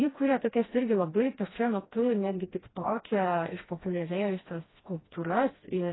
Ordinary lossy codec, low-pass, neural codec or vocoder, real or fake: AAC, 16 kbps; 7.2 kHz; codec, 16 kHz, 1 kbps, FreqCodec, smaller model; fake